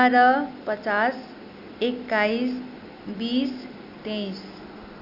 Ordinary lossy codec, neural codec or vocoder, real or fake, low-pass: AAC, 48 kbps; none; real; 5.4 kHz